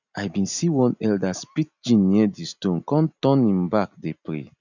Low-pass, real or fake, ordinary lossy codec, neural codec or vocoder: 7.2 kHz; real; none; none